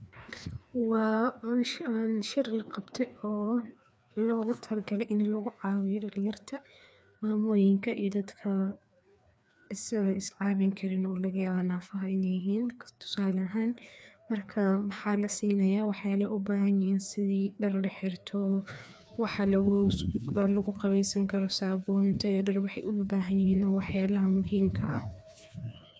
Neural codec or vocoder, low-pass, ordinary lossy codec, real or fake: codec, 16 kHz, 2 kbps, FreqCodec, larger model; none; none; fake